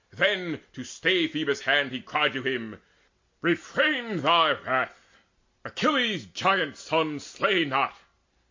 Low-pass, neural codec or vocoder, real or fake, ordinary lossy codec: 7.2 kHz; none; real; MP3, 48 kbps